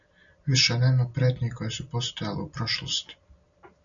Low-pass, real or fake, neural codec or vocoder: 7.2 kHz; real; none